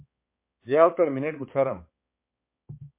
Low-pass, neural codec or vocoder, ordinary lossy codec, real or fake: 3.6 kHz; codec, 16 kHz, 4 kbps, X-Codec, HuBERT features, trained on balanced general audio; MP3, 24 kbps; fake